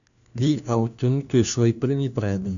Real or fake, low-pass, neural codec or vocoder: fake; 7.2 kHz; codec, 16 kHz, 1 kbps, FunCodec, trained on LibriTTS, 50 frames a second